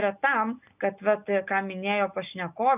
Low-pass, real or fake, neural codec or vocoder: 3.6 kHz; real; none